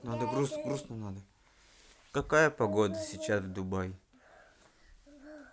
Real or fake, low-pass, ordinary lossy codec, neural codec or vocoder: real; none; none; none